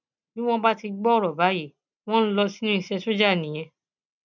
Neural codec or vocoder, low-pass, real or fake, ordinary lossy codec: none; 7.2 kHz; real; none